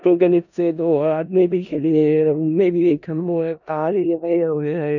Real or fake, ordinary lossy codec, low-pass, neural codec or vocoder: fake; none; 7.2 kHz; codec, 16 kHz in and 24 kHz out, 0.4 kbps, LongCat-Audio-Codec, four codebook decoder